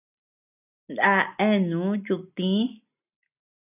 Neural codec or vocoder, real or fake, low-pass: none; real; 3.6 kHz